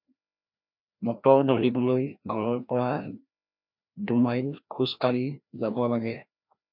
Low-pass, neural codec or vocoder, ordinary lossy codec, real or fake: 5.4 kHz; codec, 16 kHz, 1 kbps, FreqCodec, larger model; MP3, 48 kbps; fake